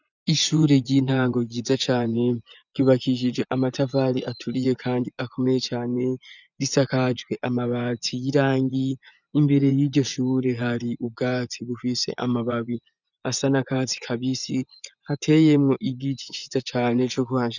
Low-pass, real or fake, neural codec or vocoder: 7.2 kHz; fake; vocoder, 24 kHz, 100 mel bands, Vocos